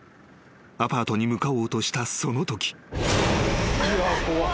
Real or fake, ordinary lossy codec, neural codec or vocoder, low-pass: real; none; none; none